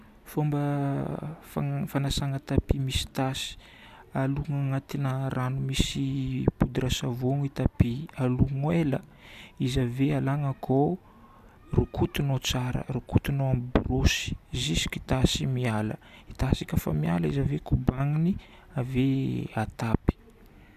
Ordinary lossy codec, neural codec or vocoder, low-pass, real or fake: none; none; 14.4 kHz; real